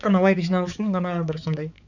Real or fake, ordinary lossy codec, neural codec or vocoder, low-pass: fake; none; codec, 16 kHz, 4 kbps, X-Codec, HuBERT features, trained on balanced general audio; 7.2 kHz